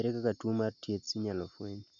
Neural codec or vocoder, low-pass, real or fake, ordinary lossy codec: none; 7.2 kHz; real; none